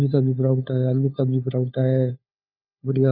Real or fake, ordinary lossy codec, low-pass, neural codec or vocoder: fake; none; 5.4 kHz; codec, 16 kHz, 4 kbps, FunCodec, trained on LibriTTS, 50 frames a second